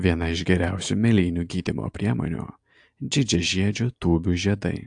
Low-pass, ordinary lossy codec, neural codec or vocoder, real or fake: 9.9 kHz; AAC, 64 kbps; none; real